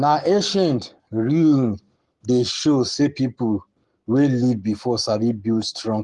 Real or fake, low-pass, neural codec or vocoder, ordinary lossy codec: fake; 10.8 kHz; codec, 44.1 kHz, 7.8 kbps, Pupu-Codec; Opus, 24 kbps